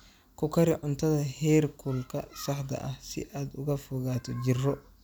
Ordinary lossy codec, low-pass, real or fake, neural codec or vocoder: none; none; real; none